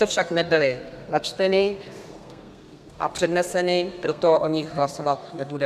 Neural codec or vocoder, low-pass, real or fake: codec, 32 kHz, 1.9 kbps, SNAC; 14.4 kHz; fake